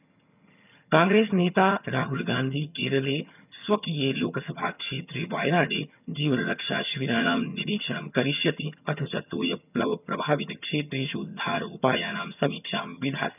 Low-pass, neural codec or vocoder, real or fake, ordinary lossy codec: 3.6 kHz; vocoder, 22.05 kHz, 80 mel bands, HiFi-GAN; fake; none